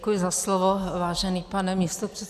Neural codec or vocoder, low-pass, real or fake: none; 14.4 kHz; real